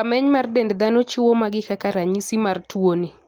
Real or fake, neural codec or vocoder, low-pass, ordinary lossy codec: real; none; 19.8 kHz; Opus, 24 kbps